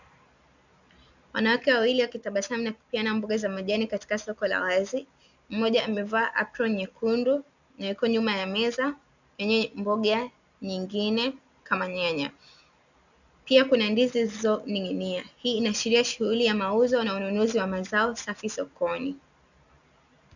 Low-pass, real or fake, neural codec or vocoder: 7.2 kHz; real; none